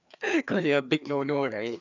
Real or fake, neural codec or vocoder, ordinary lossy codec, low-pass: fake; codec, 16 kHz, 2 kbps, FreqCodec, larger model; none; 7.2 kHz